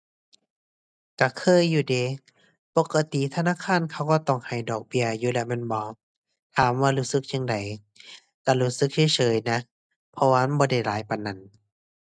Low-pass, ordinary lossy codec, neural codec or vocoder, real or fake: none; none; none; real